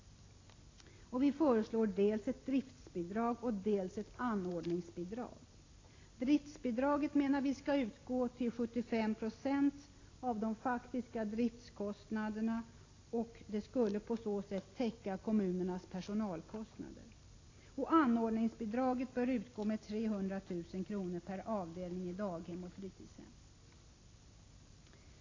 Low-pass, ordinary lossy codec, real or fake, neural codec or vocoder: 7.2 kHz; AAC, 32 kbps; real; none